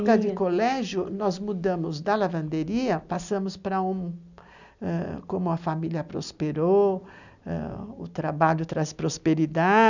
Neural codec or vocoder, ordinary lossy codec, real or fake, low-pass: none; none; real; 7.2 kHz